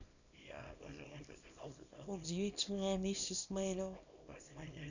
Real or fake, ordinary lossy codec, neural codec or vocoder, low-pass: fake; none; codec, 24 kHz, 0.9 kbps, WavTokenizer, small release; 7.2 kHz